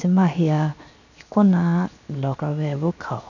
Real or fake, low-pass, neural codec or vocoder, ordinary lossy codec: fake; 7.2 kHz; codec, 16 kHz, 0.7 kbps, FocalCodec; none